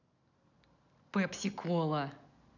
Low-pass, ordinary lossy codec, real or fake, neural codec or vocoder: 7.2 kHz; none; real; none